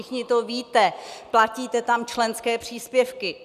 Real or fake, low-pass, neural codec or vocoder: real; 14.4 kHz; none